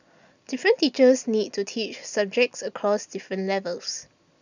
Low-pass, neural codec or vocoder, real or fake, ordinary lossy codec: 7.2 kHz; none; real; none